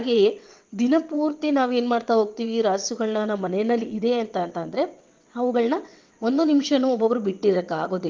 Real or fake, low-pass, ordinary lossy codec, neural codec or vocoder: fake; 7.2 kHz; Opus, 32 kbps; vocoder, 22.05 kHz, 80 mel bands, WaveNeXt